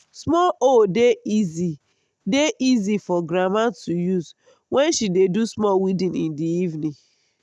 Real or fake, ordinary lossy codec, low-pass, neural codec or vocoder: real; none; none; none